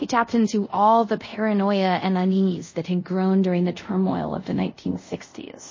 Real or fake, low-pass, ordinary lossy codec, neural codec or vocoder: fake; 7.2 kHz; MP3, 32 kbps; codec, 24 kHz, 0.5 kbps, DualCodec